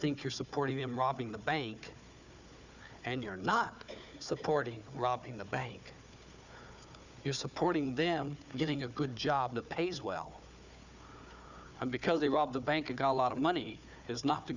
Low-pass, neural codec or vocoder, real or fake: 7.2 kHz; codec, 16 kHz, 4 kbps, FunCodec, trained on Chinese and English, 50 frames a second; fake